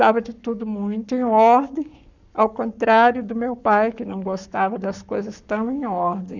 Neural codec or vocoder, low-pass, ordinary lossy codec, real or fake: codec, 44.1 kHz, 7.8 kbps, Pupu-Codec; 7.2 kHz; none; fake